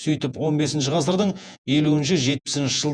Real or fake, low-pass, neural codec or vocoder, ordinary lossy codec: fake; 9.9 kHz; vocoder, 48 kHz, 128 mel bands, Vocos; none